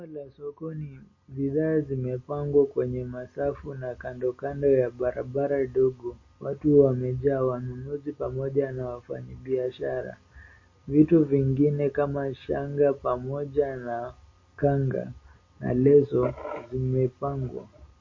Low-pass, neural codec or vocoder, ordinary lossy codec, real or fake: 7.2 kHz; none; MP3, 32 kbps; real